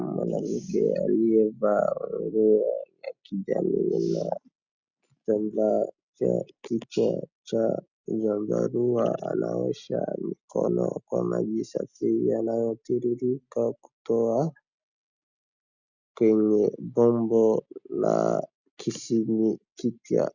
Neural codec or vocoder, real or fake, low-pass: none; real; 7.2 kHz